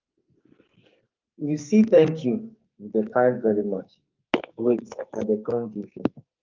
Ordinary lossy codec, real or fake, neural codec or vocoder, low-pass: Opus, 24 kbps; fake; codec, 44.1 kHz, 2.6 kbps, SNAC; 7.2 kHz